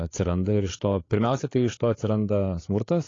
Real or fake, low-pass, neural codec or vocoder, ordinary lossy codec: fake; 7.2 kHz; codec, 16 kHz, 8 kbps, FreqCodec, larger model; AAC, 32 kbps